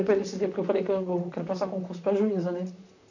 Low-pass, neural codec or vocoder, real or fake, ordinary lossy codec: 7.2 kHz; vocoder, 44.1 kHz, 128 mel bands, Pupu-Vocoder; fake; none